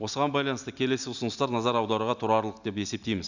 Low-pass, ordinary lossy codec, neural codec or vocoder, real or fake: 7.2 kHz; none; none; real